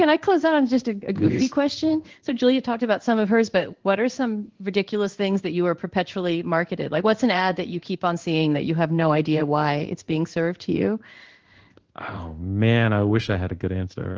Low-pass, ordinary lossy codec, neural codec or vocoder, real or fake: 7.2 kHz; Opus, 16 kbps; codec, 16 kHz in and 24 kHz out, 1 kbps, XY-Tokenizer; fake